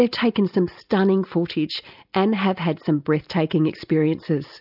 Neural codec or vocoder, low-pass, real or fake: none; 5.4 kHz; real